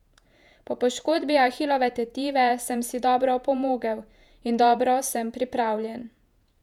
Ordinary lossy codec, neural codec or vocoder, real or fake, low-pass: none; vocoder, 48 kHz, 128 mel bands, Vocos; fake; 19.8 kHz